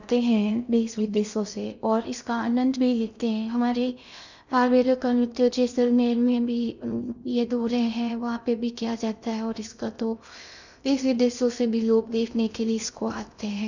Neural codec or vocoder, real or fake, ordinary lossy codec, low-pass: codec, 16 kHz in and 24 kHz out, 0.6 kbps, FocalCodec, streaming, 2048 codes; fake; none; 7.2 kHz